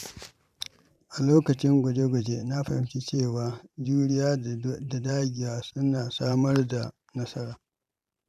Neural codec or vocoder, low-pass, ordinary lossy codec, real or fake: vocoder, 44.1 kHz, 128 mel bands every 512 samples, BigVGAN v2; 14.4 kHz; none; fake